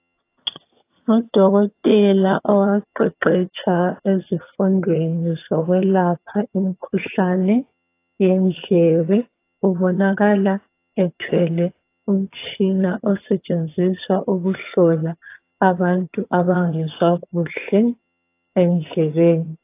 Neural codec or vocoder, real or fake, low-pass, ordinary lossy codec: vocoder, 22.05 kHz, 80 mel bands, HiFi-GAN; fake; 3.6 kHz; AAC, 24 kbps